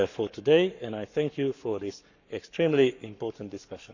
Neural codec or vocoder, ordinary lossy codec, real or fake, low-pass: codec, 44.1 kHz, 7.8 kbps, Pupu-Codec; none; fake; 7.2 kHz